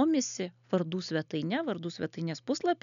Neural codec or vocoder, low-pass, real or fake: none; 7.2 kHz; real